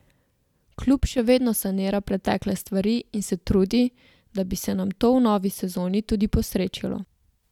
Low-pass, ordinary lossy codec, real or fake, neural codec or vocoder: 19.8 kHz; none; real; none